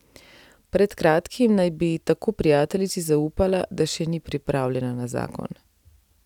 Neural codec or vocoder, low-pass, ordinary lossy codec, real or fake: none; 19.8 kHz; none; real